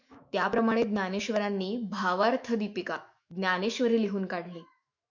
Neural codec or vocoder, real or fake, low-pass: autoencoder, 48 kHz, 128 numbers a frame, DAC-VAE, trained on Japanese speech; fake; 7.2 kHz